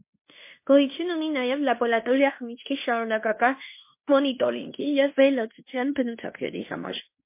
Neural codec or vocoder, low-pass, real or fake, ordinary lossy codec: codec, 16 kHz in and 24 kHz out, 0.9 kbps, LongCat-Audio-Codec, fine tuned four codebook decoder; 3.6 kHz; fake; MP3, 24 kbps